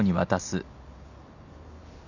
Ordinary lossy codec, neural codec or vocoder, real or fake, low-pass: none; none; real; 7.2 kHz